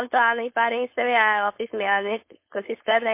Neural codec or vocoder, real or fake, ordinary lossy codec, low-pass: codec, 16 kHz, 4.8 kbps, FACodec; fake; MP3, 24 kbps; 3.6 kHz